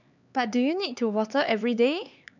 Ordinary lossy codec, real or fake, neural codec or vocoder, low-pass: none; fake; codec, 16 kHz, 4 kbps, X-Codec, HuBERT features, trained on LibriSpeech; 7.2 kHz